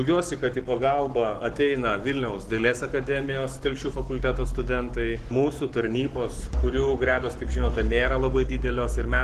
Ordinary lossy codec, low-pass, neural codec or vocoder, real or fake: Opus, 16 kbps; 14.4 kHz; codec, 44.1 kHz, 7.8 kbps, Pupu-Codec; fake